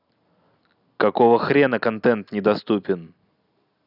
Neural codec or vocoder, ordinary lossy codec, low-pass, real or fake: none; none; 5.4 kHz; real